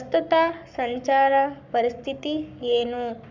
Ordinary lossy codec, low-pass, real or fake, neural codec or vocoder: none; 7.2 kHz; fake; codec, 44.1 kHz, 7.8 kbps, Pupu-Codec